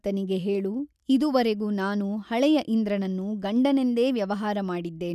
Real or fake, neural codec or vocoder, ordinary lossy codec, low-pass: real; none; none; 14.4 kHz